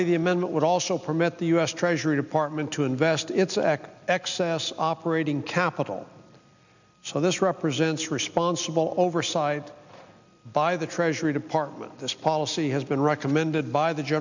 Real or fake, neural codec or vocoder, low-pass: real; none; 7.2 kHz